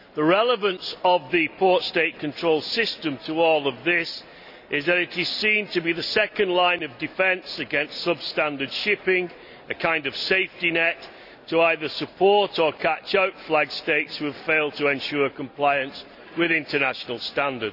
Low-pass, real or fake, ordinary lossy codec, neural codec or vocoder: 5.4 kHz; real; none; none